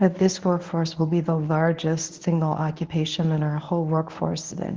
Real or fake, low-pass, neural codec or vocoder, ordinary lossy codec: fake; 7.2 kHz; codec, 24 kHz, 0.9 kbps, WavTokenizer, medium speech release version 1; Opus, 16 kbps